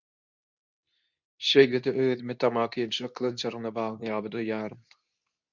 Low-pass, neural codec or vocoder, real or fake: 7.2 kHz; codec, 24 kHz, 0.9 kbps, WavTokenizer, medium speech release version 2; fake